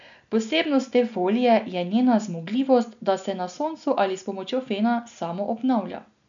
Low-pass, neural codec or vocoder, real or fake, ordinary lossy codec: 7.2 kHz; none; real; none